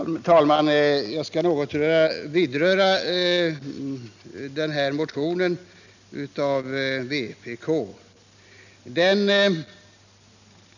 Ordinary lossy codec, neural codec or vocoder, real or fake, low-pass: none; none; real; 7.2 kHz